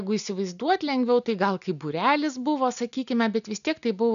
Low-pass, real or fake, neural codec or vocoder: 7.2 kHz; real; none